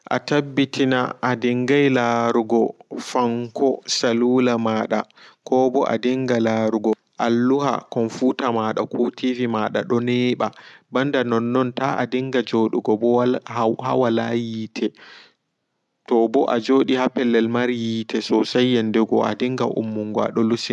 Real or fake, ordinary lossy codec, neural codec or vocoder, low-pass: real; none; none; none